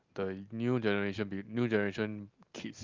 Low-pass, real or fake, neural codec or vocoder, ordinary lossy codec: 7.2 kHz; real; none; Opus, 24 kbps